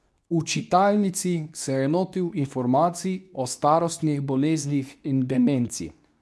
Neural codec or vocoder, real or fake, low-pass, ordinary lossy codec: codec, 24 kHz, 0.9 kbps, WavTokenizer, medium speech release version 2; fake; none; none